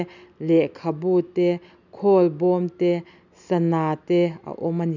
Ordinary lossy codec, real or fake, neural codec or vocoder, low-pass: none; real; none; 7.2 kHz